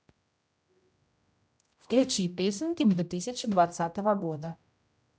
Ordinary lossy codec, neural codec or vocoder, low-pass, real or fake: none; codec, 16 kHz, 0.5 kbps, X-Codec, HuBERT features, trained on general audio; none; fake